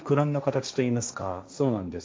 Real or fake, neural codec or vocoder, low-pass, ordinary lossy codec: fake; codec, 16 kHz, 1.1 kbps, Voila-Tokenizer; none; none